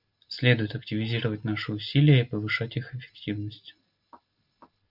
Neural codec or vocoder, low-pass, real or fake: none; 5.4 kHz; real